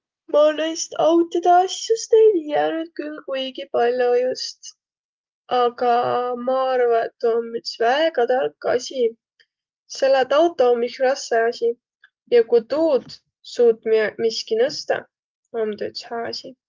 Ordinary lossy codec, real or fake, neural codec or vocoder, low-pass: Opus, 32 kbps; real; none; 7.2 kHz